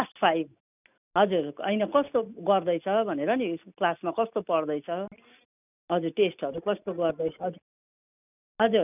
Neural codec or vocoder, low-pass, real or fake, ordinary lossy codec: none; 3.6 kHz; real; none